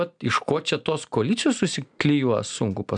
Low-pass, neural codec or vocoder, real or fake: 9.9 kHz; none; real